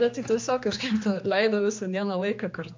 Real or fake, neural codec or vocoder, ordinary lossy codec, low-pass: fake; codec, 16 kHz, 2 kbps, X-Codec, HuBERT features, trained on general audio; MP3, 64 kbps; 7.2 kHz